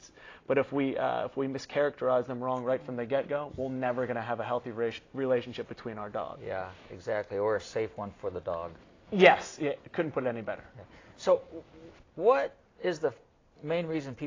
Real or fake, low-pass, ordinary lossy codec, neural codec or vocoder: real; 7.2 kHz; AAC, 32 kbps; none